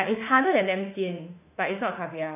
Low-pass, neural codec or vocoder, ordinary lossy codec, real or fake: 3.6 kHz; autoencoder, 48 kHz, 32 numbers a frame, DAC-VAE, trained on Japanese speech; none; fake